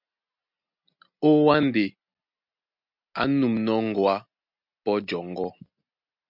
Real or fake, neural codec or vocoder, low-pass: real; none; 5.4 kHz